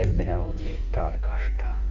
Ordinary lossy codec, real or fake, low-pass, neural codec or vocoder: none; fake; 7.2 kHz; codec, 16 kHz in and 24 kHz out, 1.1 kbps, FireRedTTS-2 codec